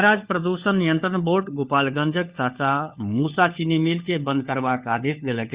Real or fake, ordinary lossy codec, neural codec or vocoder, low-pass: fake; Opus, 64 kbps; codec, 16 kHz, 4 kbps, FunCodec, trained on LibriTTS, 50 frames a second; 3.6 kHz